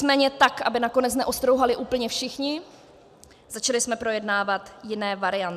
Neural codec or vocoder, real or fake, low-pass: none; real; 14.4 kHz